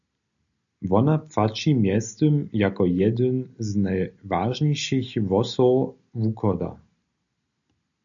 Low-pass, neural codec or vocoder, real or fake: 7.2 kHz; none; real